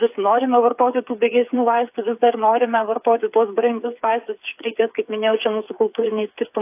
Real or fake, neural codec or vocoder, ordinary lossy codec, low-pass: fake; codec, 16 kHz, 8 kbps, FreqCodec, smaller model; AAC, 32 kbps; 3.6 kHz